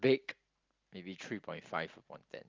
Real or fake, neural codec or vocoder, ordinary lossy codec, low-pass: real; none; Opus, 24 kbps; 7.2 kHz